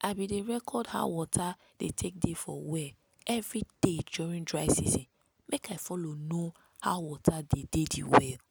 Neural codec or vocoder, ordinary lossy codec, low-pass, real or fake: none; none; none; real